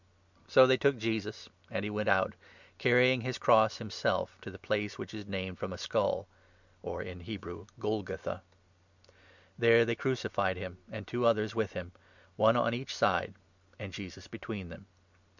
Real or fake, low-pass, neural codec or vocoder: real; 7.2 kHz; none